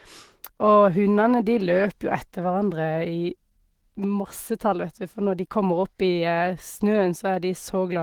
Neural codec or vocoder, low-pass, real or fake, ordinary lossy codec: autoencoder, 48 kHz, 128 numbers a frame, DAC-VAE, trained on Japanese speech; 19.8 kHz; fake; Opus, 16 kbps